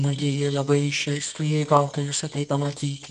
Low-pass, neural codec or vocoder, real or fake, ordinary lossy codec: 10.8 kHz; codec, 24 kHz, 0.9 kbps, WavTokenizer, medium music audio release; fake; MP3, 96 kbps